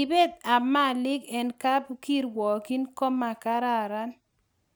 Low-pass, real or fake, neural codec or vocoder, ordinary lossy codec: none; real; none; none